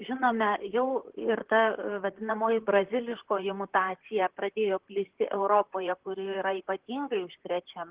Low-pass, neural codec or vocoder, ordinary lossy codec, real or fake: 3.6 kHz; vocoder, 44.1 kHz, 128 mel bands, Pupu-Vocoder; Opus, 16 kbps; fake